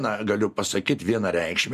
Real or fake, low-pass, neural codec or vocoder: real; 14.4 kHz; none